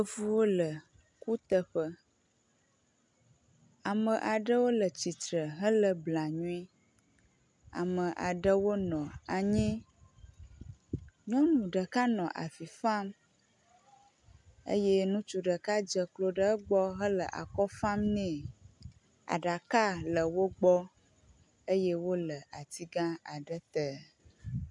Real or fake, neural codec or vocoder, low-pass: real; none; 10.8 kHz